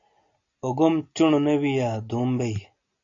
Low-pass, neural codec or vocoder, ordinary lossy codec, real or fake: 7.2 kHz; none; AAC, 48 kbps; real